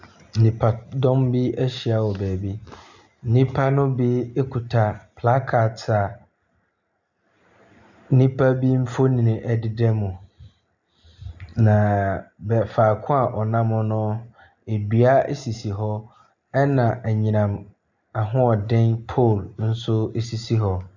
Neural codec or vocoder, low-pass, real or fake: none; 7.2 kHz; real